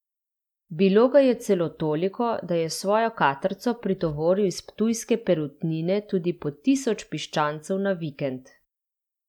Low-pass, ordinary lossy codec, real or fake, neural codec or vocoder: 19.8 kHz; none; real; none